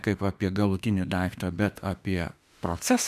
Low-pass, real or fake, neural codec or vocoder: 14.4 kHz; fake; autoencoder, 48 kHz, 32 numbers a frame, DAC-VAE, trained on Japanese speech